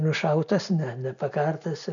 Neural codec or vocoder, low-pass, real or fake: none; 7.2 kHz; real